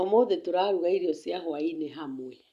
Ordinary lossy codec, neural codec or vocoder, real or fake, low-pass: Opus, 64 kbps; none; real; 14.4 kHz